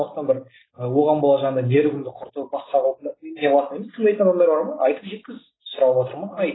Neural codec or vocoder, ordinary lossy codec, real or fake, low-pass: none; AAC, 16 kbps; real; 7.2 kHz